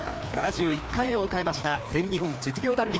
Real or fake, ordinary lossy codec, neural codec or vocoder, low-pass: fake; none; codec, 16 kHz, 2 kbps, FreqCodec, larger model; none